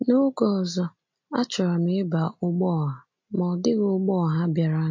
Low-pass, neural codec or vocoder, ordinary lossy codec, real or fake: 7.2 kHz; none; MP3, 48 kbps; real